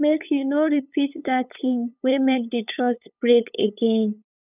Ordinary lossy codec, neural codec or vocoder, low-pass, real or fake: none; codec, 16 kHz, 8 kbps, FunCodec, trained on LibriTTS, 25 frames a second; 3.6 kHz; fake